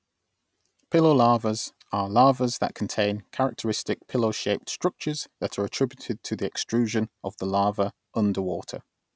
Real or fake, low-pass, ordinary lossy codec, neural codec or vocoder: real; none; none; none